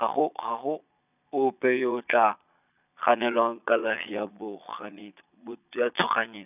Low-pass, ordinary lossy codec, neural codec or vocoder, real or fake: 3.6 kHz; none; vocoder, 22.05 kHz, 80 mel bands, Vocos; fake